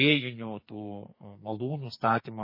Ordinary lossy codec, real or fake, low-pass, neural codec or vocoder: MP3, 24 kbps; fake; 5.4 kHz; codec, 44.1 kHz, 2.6 kbps, SNAC